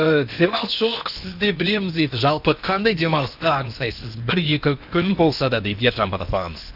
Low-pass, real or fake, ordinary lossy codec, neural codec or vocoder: 5.4 kHz; fake; none; codec, 16 kHz in and 24 kHz out, 0.8 kbps, FocalCodec, streaming, 65536 codes